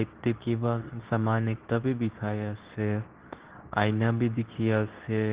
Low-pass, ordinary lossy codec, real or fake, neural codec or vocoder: 3.6 kHz; Opus, 16 kbps; fake; codec, 24 kHz, 0.9 kbps, WavTokenizer, medium speech release version 2